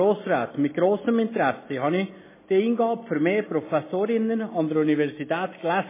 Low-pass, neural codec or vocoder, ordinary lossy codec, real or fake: 3.6 kHz; none; MP3, 16 kbps; real